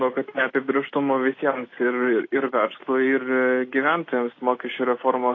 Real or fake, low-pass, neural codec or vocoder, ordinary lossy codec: real; 7.2 kHz; none; AAC, 32 kbps